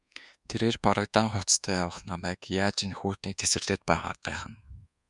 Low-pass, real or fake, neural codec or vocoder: 10.8 kHz; fake; codec, 24 kHz, 1.2 kbps, DualCodec